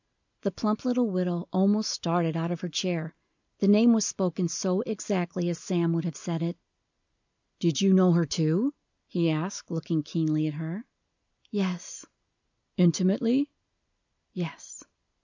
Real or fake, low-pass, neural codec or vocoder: real; 7.2 kHz; none